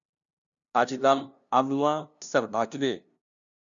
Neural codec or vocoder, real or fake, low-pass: codec, 16 kHz, 0.5 kbps, FunCodec, trained on LibriTTS, 25 frames a second; fake; 7.2 kHz